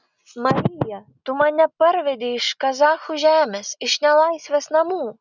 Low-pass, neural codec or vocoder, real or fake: 7.2 kHz; none; real